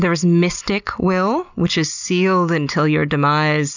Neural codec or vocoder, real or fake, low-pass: none; real; 7.2 kHz